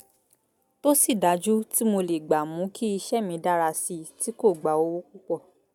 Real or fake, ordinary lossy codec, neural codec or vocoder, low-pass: real; none; none; 19.8 kHz